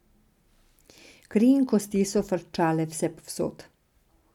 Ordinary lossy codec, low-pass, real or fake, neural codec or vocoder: none; 19.8 kHz; real; none